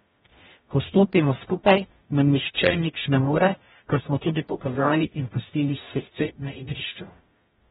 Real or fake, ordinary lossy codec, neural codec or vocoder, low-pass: fake; AAC, 16 kbps; codec, 44.1 kHz, 0.9 kbps, DAC; 19.8 kHz